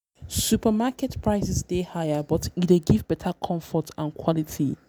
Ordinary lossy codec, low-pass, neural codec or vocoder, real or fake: none; none; none; real